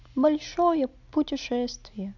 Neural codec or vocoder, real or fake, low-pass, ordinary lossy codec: none; real; 7.2 kHz; none